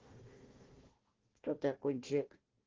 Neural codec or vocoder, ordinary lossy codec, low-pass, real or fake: codec, 16 kHz, 1 kbps, FunCodec, trained on Chinese and English, 50 frames a second; Opus, 16 kbps; 7.2 kHz; fake